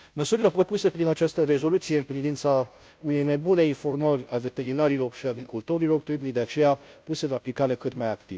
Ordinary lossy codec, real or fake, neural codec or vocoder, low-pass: none; fake; codec, 16 kHz, 0.5 kbps, FunCodec, trained on Chinese and English, 25 frames a second; none